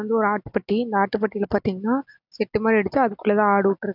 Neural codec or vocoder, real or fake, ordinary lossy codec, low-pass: none; real; none; 5.4 kHz